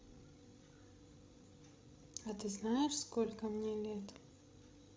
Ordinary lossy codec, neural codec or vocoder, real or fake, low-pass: none; codec, 16 kHz, 16 kbps, FreqCodec, larger model; fake; none